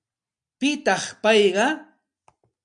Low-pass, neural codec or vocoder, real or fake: 9.9 kHz; none; real